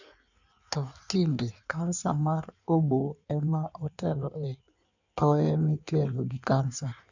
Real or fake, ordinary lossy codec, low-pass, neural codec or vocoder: fake; none; 7.2 kHz; codec, 16 kHz in and 24 kHz out, 1.1 kbps, FireRedTTS-2 codec